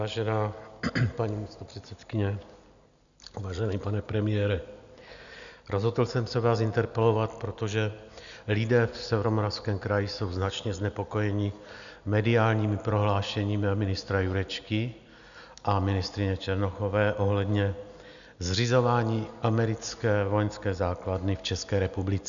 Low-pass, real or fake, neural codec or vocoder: 7.2 kHz; real; none